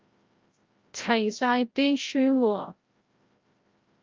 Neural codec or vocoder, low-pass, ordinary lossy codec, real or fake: codec, 16 kHz, 0.5 kbps, FreqCodec, larger model; 7.2 kHz; Opus, 24 kbps; fake